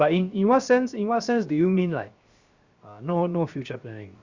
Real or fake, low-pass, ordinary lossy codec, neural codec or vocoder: fake; 7.2 kHz; Opus, 64 kbps; codec, 16 kHz, 0.7 kbps, FocalCodec